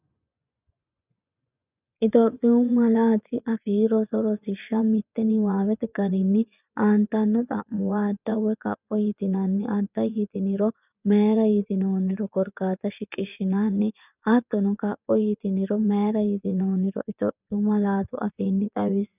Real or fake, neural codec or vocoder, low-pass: fake; vocoder, 24 kHz, 100 mel bands, Vocos; 3.6 kHz